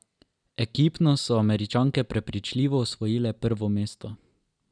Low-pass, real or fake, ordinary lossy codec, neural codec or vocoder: 9.9 kHz; real; none; none